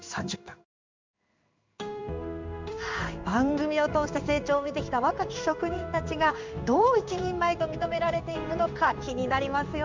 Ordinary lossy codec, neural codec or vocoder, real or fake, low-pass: MP3, 64 kbps; codec, 16 kHz in and 24 kHz out, 1 kbps, XY-Tokenizer; fake; 7.2 kHz